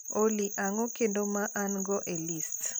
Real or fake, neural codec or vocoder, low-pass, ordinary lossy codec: real; none; none; none